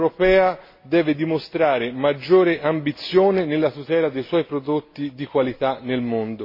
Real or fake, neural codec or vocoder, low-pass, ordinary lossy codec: real; none; 5.4 kHz; MP3, 24 kbps